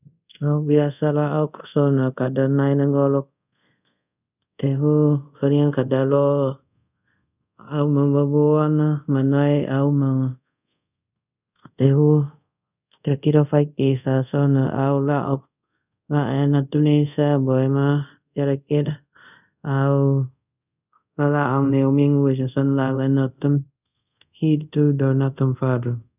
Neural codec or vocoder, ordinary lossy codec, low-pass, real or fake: codec, 24 kHz, 0.5 kbps, DualCodec; none; 3.6 kHz; fake